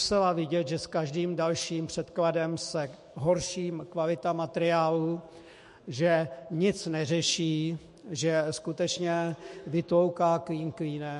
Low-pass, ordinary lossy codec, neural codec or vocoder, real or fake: 14.4 kHz; MP3, 48 kbps; autoencoder, 48 kHz, 128 numbers a frame, DAC-VAE, trained on Japanese speech; fake